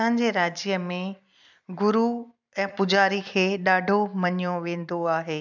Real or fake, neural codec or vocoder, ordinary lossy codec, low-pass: fake; vocoder, 44.1 kHz, 128 mel bands every 256 samples, BigVGAN v2; none; 7.2 kHz